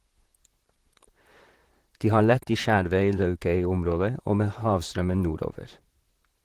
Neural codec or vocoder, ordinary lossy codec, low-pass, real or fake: vocoder, 44.1 kHz, 128 mel bands, Pupu-Vocoder; Opus, 16 kbps; 14.4 kHz; fake